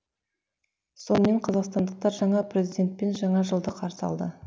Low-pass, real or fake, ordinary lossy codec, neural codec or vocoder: none; real; none; none